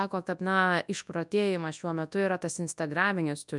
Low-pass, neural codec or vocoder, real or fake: 10.8 kHz; codec, 24 kHz, 0.9 kbps, WavTokenizer, large speech release; fake